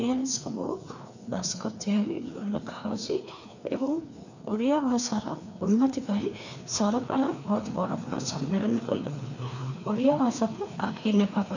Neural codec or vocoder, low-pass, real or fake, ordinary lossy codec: codec, 16 kHz, 2 kbps, FreqCodec, larger model; 7.2 kHz; fake; none